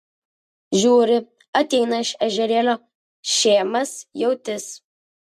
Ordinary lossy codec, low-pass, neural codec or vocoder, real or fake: MP3, 64 kbps; 14.4 kHz; none; real